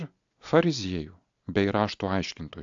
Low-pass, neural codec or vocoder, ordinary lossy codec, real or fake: 7.2 kHz; none; AAC, 64 kbps; real